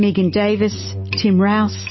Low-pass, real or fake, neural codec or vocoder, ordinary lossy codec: 7.2 kHz; real; none; MP3, 24 kbps